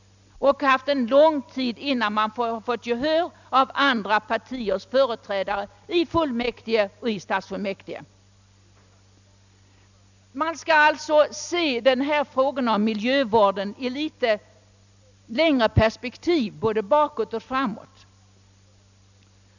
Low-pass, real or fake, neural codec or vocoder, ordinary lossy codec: 7.2 kHz; real; none; none